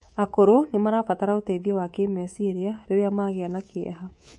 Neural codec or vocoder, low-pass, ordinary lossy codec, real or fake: codec, 24 kHz, 3.1 kbps, DualCodec; 10.8 kHz; MP3, 48 kbps; fake